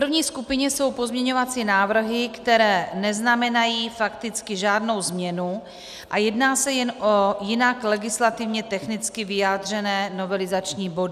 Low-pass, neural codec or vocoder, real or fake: 14.4 kHz; none; real